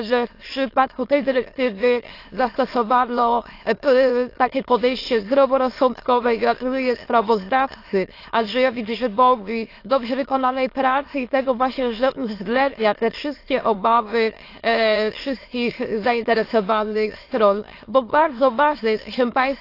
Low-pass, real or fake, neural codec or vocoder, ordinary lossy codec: 5.4 kHz; fake; autoencoder, 22.05 kHz, a latent of 192 numbers a frame, VITS, trained on many speakers; AAC, 32 kbps